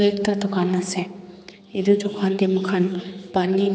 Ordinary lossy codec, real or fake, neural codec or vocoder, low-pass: none; fake; codec, 16 kHz, 4 kbps, X-Codec, HuBERT features, trained on general audio; none